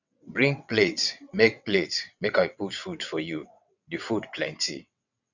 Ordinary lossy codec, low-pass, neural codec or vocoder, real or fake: none; 7.2 kHz; vocoder, 22.05 kHz, 80 mel bands, WaveNeXt; fake